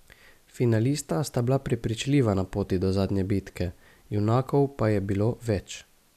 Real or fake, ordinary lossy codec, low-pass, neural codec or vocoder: real; none; 14.4 kHz; none